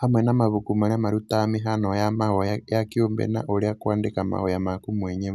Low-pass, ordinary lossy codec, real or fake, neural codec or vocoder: 14.4 kHz; none; real; none